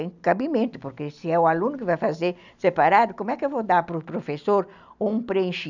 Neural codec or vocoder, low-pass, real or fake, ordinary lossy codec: none; 7.2 kHz; real; none